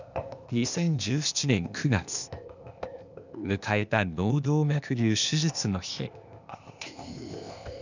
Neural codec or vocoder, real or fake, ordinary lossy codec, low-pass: codec, 16 kHz, 0.8 kbps, ZipCodec; fake; none; 7.2 kHz